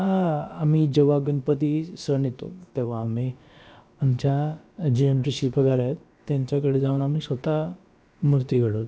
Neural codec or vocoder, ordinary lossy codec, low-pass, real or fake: codec, 16 kHz, about 1 kbps, DyCAST, with the encoder's durations; none; none; fake